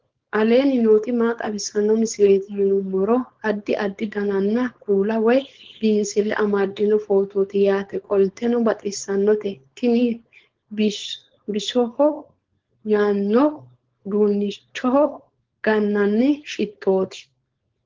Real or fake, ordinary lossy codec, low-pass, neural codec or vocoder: fake; Opus, 16 kbps; 7.2 kHz; codec, 16 kHz, 4.8 kbps, FACodec